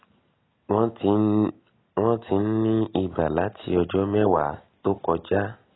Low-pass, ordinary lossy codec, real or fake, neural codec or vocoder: 7.2 kHz; AAC, 16 kbps; real; none